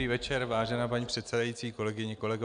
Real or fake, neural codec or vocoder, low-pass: real; none; 9.9 kHz